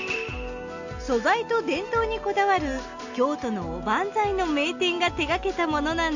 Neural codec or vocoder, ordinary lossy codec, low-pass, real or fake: none; none; 7.2 kHz; real